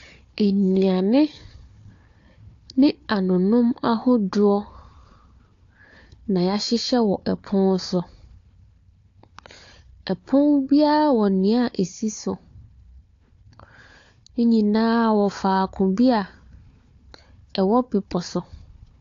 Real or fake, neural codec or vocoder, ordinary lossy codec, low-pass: fake; codec, 16 kHz, 4 kbps, FunCodec, trained on Chinese and English, 50 frames a second; AAC, 48 kbps; 7.2 kHz